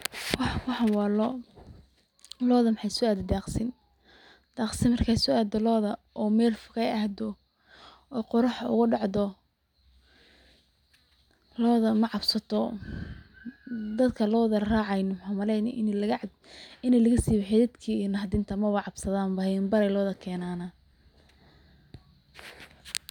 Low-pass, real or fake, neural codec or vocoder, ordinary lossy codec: 19.8 kHz; real; none; none